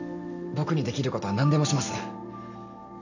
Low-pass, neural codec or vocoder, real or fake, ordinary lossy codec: 7.2 kHz; none; real; none